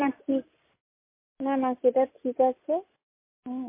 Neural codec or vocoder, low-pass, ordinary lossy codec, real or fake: none; 3.6 kHz; MP3, 24 kbps; real